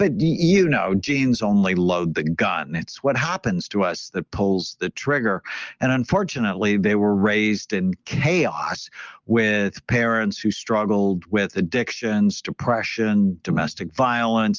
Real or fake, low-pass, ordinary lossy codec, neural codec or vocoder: real; 7.2 kHz; Opus, 24 kbps; none